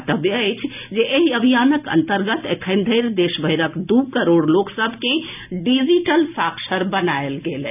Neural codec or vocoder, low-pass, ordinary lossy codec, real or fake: none; 3.6 kHz; none; real